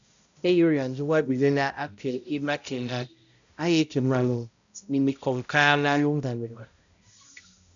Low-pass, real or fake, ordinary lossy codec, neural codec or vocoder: 7.2 kHz; fake; MP3, 96 kbps; codec, 16 kHz, 0.5 kbps, X-Codec, HuBERT features, trained on balanced general audio